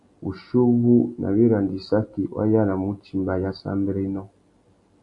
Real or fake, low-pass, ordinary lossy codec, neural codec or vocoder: real; 10.8 kHz; AAC, 64 kbps; none